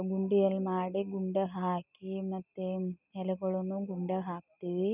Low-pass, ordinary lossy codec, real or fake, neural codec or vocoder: 3.6 kHz; none; real; none